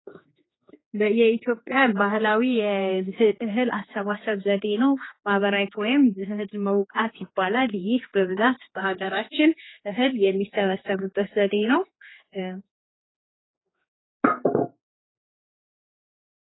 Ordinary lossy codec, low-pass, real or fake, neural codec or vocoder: AAC, 16 kbps; 7.2 kHz; fake; codec, 16 kHz, 4 kbps, X-Codec, HuBERT features, trained on general audio